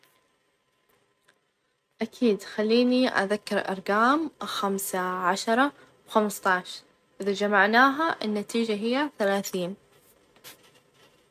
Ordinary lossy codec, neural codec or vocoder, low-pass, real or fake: MP3, 96 kbps; none; 14.4 kHz; real